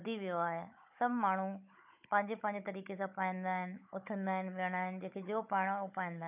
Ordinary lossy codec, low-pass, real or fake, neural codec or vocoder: none; 3.6 kHz; fake; codec, 16 kHz, 16 kbps, FunCodec, trained on Chinese and English, 50 frames a second